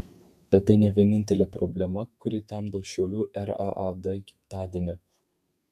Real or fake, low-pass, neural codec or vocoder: fake; 14.4 kHz; codec, 32 kHz, 1.9 kbps, SNAC